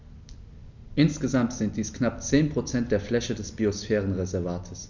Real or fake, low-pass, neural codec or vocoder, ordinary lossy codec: real; 7.2 kHz; none; none